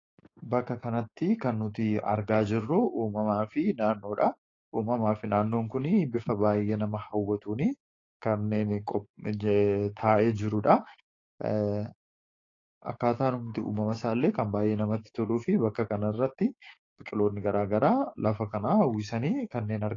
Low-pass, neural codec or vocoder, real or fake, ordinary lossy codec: 7.2 kHz; codec, 16 kHz, 6 kbps, DAC; fake; AAC, 32 kbps